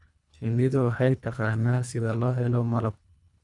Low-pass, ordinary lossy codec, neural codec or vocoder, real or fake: 10.8 kHz; none; codec, 24 kHz, 1.5 kbps, HILCodec; fake